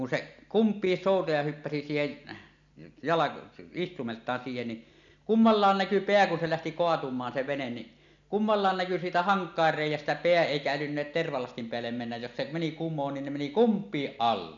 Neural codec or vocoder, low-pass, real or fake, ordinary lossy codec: none; 7.2 kHz; real; none